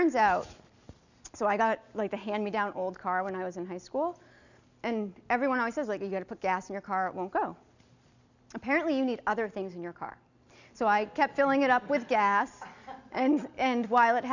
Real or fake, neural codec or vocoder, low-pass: real; none; 7.2 kHz